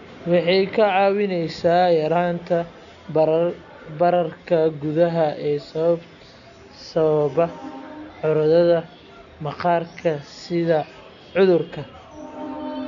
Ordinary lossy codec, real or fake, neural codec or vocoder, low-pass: none; real; none; 7.2 kHz